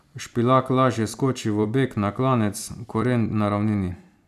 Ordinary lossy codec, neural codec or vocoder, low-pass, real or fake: none; vocoder, 44.1 kHz, 128 mel bands every 256 samples, BigVGAN v2; 14.4 kHz; fake